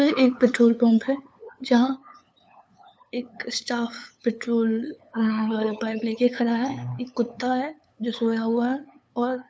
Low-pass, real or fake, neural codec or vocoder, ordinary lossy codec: none; fake; codec, 16 kHz, 8 kbps, FunCodec, trained on LibriTTS, 25 frames a second; none